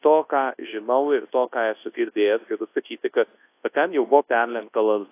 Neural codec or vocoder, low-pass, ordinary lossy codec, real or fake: codec, 24 kHz, 0.9 kbps, WavTokenizer, large speech release; 3.6 kHz; AAC, 24 kbps; fake